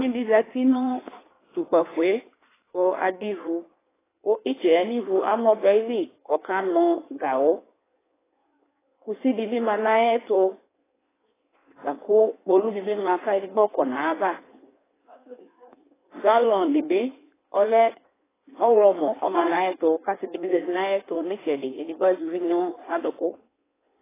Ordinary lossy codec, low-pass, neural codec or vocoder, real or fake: AAC, 16 kbps; 3.6 kHz; codec, 16 kHz in and 24 kHz out, 1.1 kbps, FireRedTTS-2 codec; fake